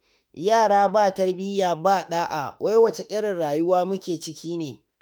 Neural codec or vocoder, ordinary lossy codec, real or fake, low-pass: autoencoder, 48 kHz, 32 numbers a frame, DAC-VAE, trained on Japanese speech; none; fake; none